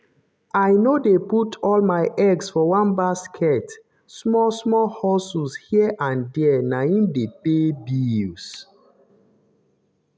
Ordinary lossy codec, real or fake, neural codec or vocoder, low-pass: none; real; none; none